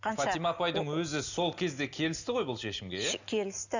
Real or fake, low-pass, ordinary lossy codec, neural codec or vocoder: real; 7.2 kHz; none; none